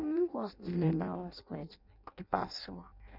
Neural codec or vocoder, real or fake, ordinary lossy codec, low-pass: codec, 16 kHz in and 24 kHz out, 0.6 kbps, FireRedTTS-2 codec; fake; none; 5.4 kHz